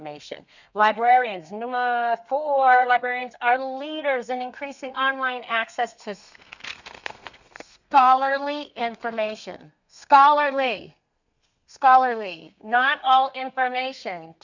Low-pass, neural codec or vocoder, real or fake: 7.2 kHz; codec, 32 kHz, 1.9 kbps, SNAC; fake